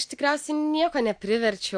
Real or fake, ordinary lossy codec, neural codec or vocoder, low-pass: real; AAC, 64 kbps; none; 9.9 kHz